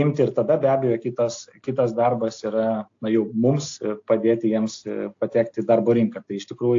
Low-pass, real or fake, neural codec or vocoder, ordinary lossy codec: 7.2 kHz; real; none; AAC, 48 kbps